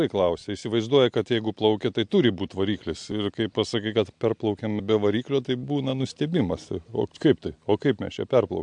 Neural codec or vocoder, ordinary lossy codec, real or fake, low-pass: none; MP3, 64 kbps; real; 9.9 kHz